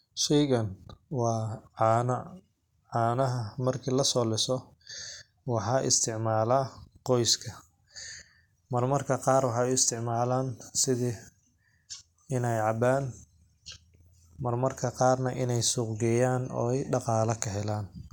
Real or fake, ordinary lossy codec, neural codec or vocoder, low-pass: real; none; none; 19.8 kHz